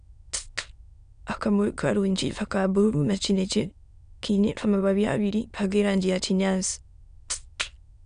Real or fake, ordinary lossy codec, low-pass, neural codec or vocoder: fake; none; 9.9 kHz; autoencoder, 22.05 kHz, a latent of 192 numbers a frame, VITS, trained on many speakers